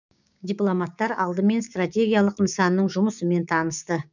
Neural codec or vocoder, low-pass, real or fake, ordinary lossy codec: codec, 16 kHz, 6 kbps, DAC; 7.2 kHz; fake; none